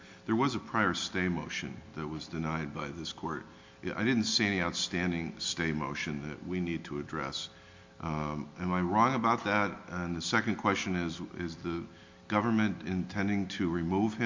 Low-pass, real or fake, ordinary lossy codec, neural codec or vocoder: 7.2 kHz; real; MP3, 48 kbps; none